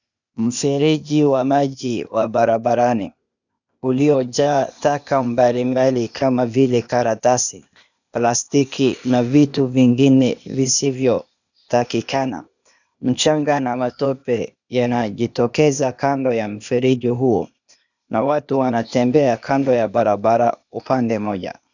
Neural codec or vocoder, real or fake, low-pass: codec, 16 kHz, 0.8 kbps, ZipCodec; fake; 7.2 kHz